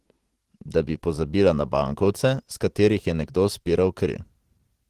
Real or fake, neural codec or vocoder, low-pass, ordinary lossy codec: fake; vocoder, 44.1 kHz, 128 mel bands every 512 samples, BigVGAN v2; 14.4 kHz; Opus, 16 kbps